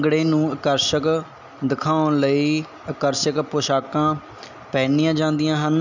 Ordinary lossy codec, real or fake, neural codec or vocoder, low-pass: none; real; none; 7.2 kHz